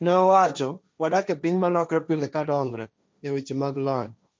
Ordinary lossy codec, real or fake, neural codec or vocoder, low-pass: none; fake; codec, 16 kHz, 1.1 kbps, Voila-Tokenizer; none